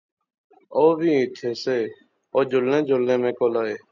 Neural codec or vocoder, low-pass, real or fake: none; 7.2 kHz; real